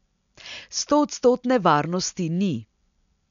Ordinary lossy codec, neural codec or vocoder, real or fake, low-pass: none; none; real; 7.2 kHz